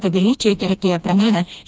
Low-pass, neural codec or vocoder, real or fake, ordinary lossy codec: none; codec, 16 kHz, 1 kbps, FreqCodec, smaller model; fake; none